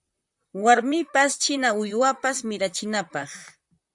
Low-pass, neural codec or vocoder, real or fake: 10.8 kHz; vocoder, 44.1 kHz, 128 mel bands, Pupu-Vocoder; fake